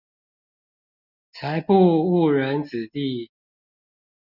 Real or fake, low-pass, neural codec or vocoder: real; 5.4 kHz; none